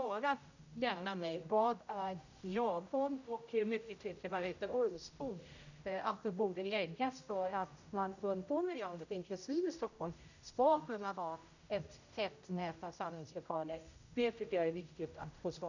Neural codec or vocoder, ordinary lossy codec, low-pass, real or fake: codec, 16 kHz, 0.5 kbps, X-Codec, HuBERT features, trained on general audio; none; 7.2 kHz; fake